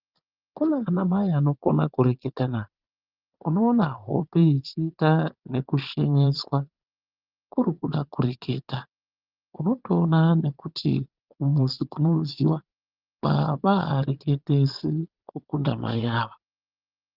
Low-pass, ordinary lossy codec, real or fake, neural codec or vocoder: 5.4 kHz; Opus, 24 kbps; fake; vocoder, 22.05 kHz, 80 mel bands, Vocos